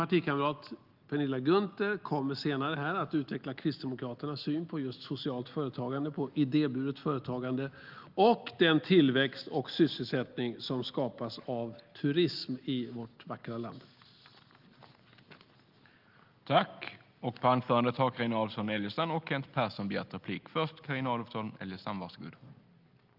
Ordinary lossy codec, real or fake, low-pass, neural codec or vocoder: Opus, 32 kbps; real; 5.4 kHz; none